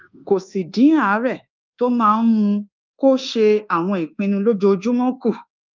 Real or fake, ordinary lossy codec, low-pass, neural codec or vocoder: fake; Opus, 32 kbps; 7.2 kHz; codec, 24 kHz, 1.2 kbps, DualCodec